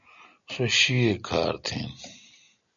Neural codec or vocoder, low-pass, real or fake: none; 7.2 kHz; real